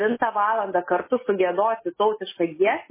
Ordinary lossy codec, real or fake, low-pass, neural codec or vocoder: MP3, 16 kbps; real; 3.6 kHz; none